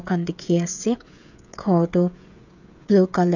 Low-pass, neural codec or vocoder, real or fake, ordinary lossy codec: 7.2 kHz; codec, 16 kHz, 16 kbps, FreqCodec, smaller model; fake; none